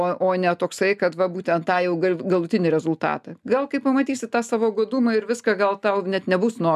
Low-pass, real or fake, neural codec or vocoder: 14.4 kHz; real; none